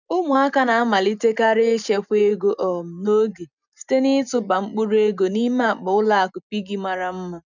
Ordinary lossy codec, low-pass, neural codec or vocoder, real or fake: none; 7.2 kHz; none; real